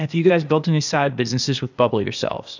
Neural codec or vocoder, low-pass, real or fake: codec, 16 kHz, 0.8 kbps, ZipCodec; 7.2 kHz; fake